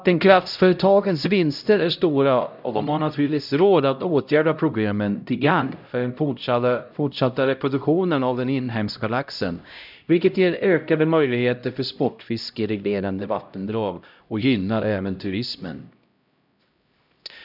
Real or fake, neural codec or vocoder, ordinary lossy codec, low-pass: fake; codec, 16 kHz, 0.5 kbps, X-Codec, HuBERT features, trained on LibriSpeech; none; 5.4 kHz